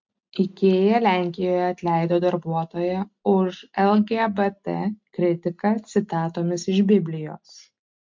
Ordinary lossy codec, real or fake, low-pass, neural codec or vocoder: MP3, 48 kbps; real; 7.2 kHz; none